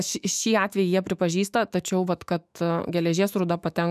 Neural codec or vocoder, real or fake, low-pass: autoencoder, 48 kHz, 128 numbers a frame, DAC-VAE, trained on Japanese speech; fake; 14.4 kHz